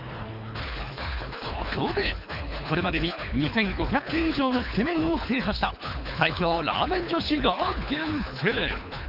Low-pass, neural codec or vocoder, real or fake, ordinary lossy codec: 5.4 kHz; codec, 24 kHz, 3 kbps, HILCodec; fake; none